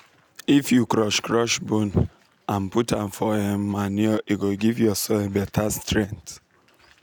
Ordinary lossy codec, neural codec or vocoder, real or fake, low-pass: none; none; real; none